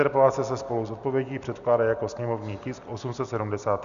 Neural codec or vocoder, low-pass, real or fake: none; 7.2 kHz; real